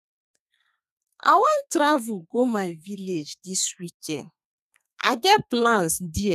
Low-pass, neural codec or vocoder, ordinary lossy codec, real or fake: 14.4 kHz; codec, 32 kHz, 1.9 kbps, SNAC; none; fake